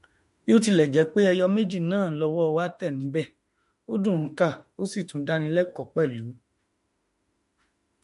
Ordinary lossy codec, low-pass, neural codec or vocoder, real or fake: MP3, 48 kbps; 14.4 kHz; autoencoder, 48 kHz, 32 numbers a frame, DAC-VAE, trained on Japanese speech; fake